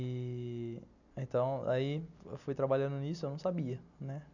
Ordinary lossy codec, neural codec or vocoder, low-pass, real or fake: none; none; 7.2 kHz; real